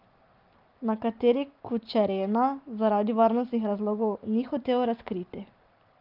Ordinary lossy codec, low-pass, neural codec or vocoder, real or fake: Opus, 32 kbps; 5.4 kHz; none; real